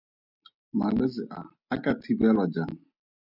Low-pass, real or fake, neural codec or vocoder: 5.4 kHz; real; none